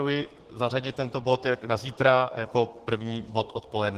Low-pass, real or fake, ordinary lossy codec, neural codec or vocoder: 14.4 kHz; fake; Opus, 16 kbps; codec, 32 kHz, 1.9 kbps, SNAC